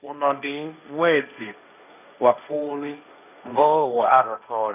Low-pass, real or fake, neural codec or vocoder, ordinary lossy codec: 3.6 kHz; fake; codec, 16 kHz, 1.1 kbps, Voila-Tokenizer; none